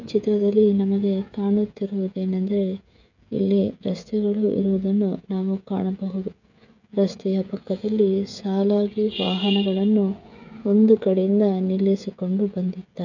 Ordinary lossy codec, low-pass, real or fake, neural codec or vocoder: none; 7.2 kHz; fake; codec, 16 kHz, 16 kbps, FreqCodec, smaller model